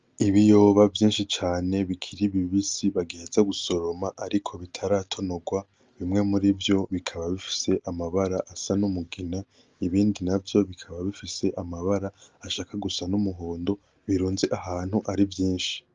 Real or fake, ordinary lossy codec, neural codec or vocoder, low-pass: real; Opus, 32 kbps; none; 7.2 kHz